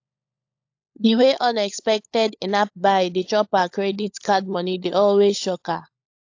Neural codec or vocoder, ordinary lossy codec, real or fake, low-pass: codec, 16 kHz, 16 kbps, FunCodec, trained on LibriTTS, 50 frames a second; AAC, 48 kbps; fake; 7.2 kHz